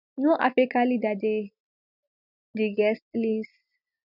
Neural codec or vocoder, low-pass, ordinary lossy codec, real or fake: none; 5.4 kHz; none; real